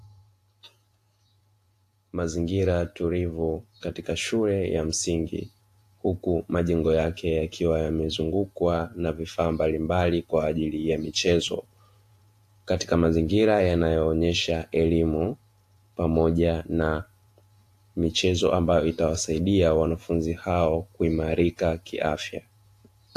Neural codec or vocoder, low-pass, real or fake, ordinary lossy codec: vocoder, 48 kHz, 128 mel bands, Vocos; 14.4 kHz; fake; AAC, 64 kbps